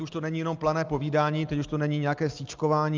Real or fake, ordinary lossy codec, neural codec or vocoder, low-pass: real; Opus, 24 kbps; none; 7.2 kHz